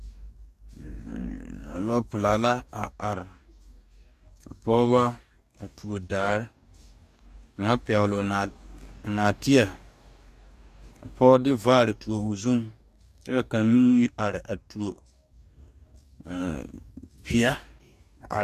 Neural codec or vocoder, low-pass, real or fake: codec, 44.1 kHz, 2.6 kbps, DAC; 14.4 kHz; fake